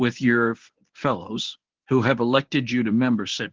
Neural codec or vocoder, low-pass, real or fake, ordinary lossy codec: codec, 24 kHz, 0.9 kbps, WavTokenizer, medium speech release version 1; 7.2 kHz; fake; Opus, 16 kbps